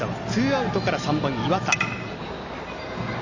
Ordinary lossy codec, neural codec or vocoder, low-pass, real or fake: MP3, 64 kbps; none; 7.2 kHz; real